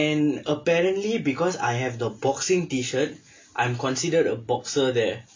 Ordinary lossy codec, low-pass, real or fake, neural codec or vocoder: MP3, 48 kbps; 7.2 kHz; real; none